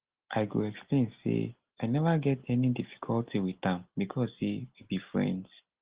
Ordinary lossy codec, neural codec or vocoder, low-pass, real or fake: Opus, 16 kbps; none; 3.6 kHz; real